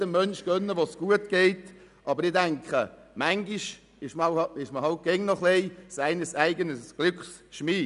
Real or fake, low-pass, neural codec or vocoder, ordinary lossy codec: real; 10.8 kHz; none; none